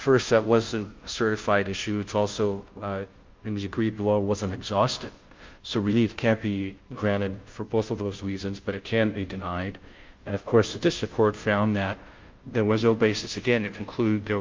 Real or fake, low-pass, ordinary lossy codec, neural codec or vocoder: fake; 7.2 kHz; Opus, 24 kbps; codec, 16 kHz, 0.5 kbps, FunCodec, trained on Chinese and English, 25 frames a second